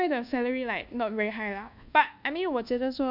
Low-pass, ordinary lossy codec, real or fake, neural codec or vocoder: 5.4 kHz; none; fake; codec, 24 kHz, 1.2 kbps, DualCodec